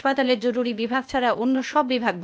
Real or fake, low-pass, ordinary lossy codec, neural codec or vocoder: fake; none; none; codec, 16 kHz, 0.8 kbps, ZipCodec